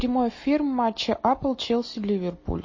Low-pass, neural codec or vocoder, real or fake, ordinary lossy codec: 7.2 kHz; none; real; MP3, 32 kbps